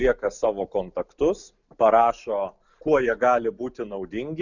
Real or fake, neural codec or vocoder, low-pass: real; none; 7.2 kHz